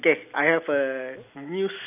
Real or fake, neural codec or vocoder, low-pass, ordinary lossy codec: real; none; 3.6 kHz; none